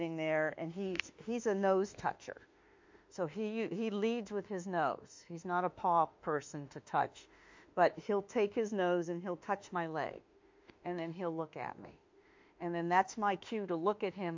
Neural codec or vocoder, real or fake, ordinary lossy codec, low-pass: autoencoder, 48 kHz, 32 numbers a frame, DAC-VAE, trained on Japanese speech; fake; MP3, 48 kbps; 7.2 kHz